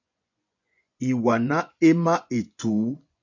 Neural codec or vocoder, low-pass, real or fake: vocoder, 24 kHz, 100 mel bands, Vocos; 7.2 kHz; fake